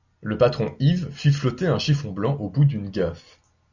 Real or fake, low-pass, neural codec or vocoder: real; 7.2 kHz; none